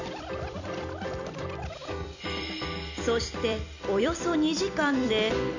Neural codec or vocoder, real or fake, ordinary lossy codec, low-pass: vocoder, 44.1 kHz, 128 mel bands every 256 samples, BigVGAN v2; fake; none; 7.2 kHz